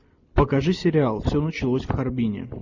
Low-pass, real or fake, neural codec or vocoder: 7.2 kHz; real; none